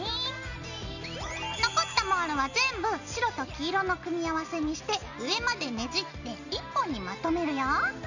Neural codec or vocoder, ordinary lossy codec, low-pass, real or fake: none; none; 7.2 kHz; real